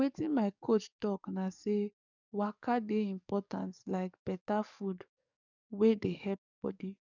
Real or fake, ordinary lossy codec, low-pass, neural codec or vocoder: fake; none; 7.2 kHz; codec, 44.1 kHz, 7.8 kbps, DAC